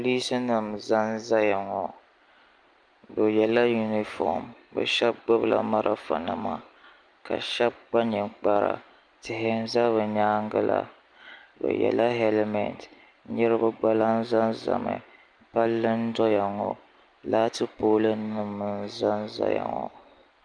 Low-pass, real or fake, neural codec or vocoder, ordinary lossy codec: 9.9 kHz; real; none; Opus, 32 kbps